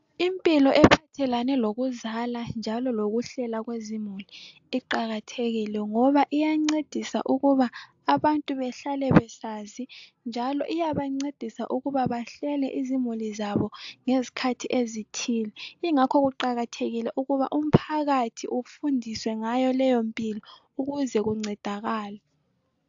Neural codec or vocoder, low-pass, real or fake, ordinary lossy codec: none; 7.2 kHz; real; AAC, 64 kbps